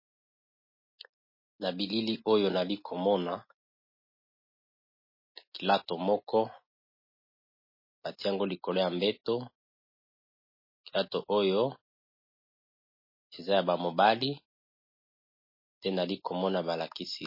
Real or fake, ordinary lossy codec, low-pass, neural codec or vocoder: real; MP3, 24 kbps; 5.4 kHz; none